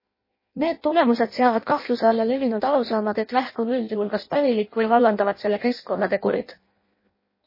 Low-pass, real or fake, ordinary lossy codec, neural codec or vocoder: 5.4 kHz; fake; MP3, 24 kbps; codec, 16 kHz in and 24 kHz out, 0.6 kbps, FireRedTTS-2 codec